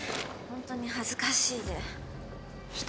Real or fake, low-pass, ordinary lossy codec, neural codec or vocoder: real; none; none; none